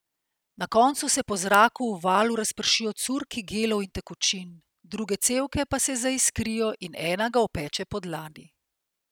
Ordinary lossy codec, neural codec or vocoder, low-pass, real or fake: none; none; none; real